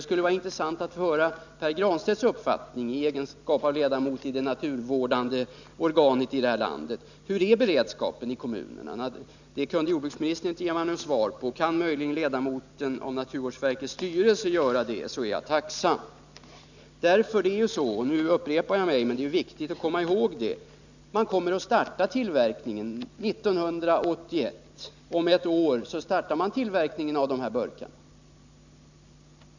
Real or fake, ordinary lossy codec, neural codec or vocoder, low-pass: real; none; none; 7.2 kHz